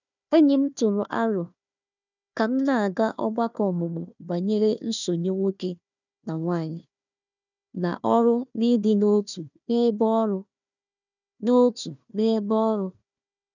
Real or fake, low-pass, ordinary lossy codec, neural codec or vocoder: fake; 7.2 kHz; none; codec, 16 kHz, 1 kbps, FunCodec, trained on Chinese and English, 50 frames a second